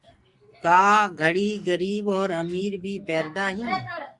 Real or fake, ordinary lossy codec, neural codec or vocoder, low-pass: fake; Opus, 64 kbps; codec, 44.1 kHz, 2.6 kbps, SNAC; 10.8 kHz